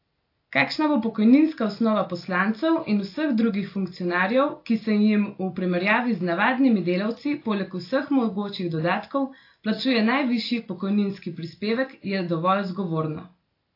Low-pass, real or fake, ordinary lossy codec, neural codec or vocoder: 5.4 kHz; real; AAC, 32 kbps; none